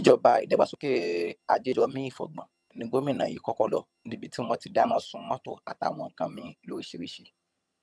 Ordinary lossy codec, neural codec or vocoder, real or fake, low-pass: none; vocoder, 22.05 kHz, 80 mel bands, HiFi-GAN; fake; none